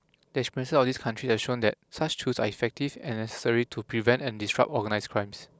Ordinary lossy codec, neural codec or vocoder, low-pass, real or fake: none; none; none; real